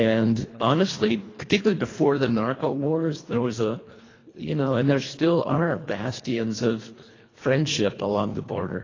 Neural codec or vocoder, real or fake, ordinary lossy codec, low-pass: codec, 24 kHz, 1.5 kbps, HILCodec; fake; AAC, 32 kbps; 7.2 kHz